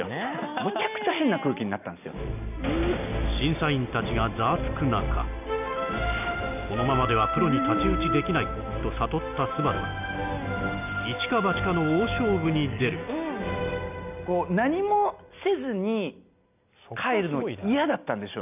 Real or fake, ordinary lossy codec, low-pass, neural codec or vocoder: real; none; 3.6 kHz; none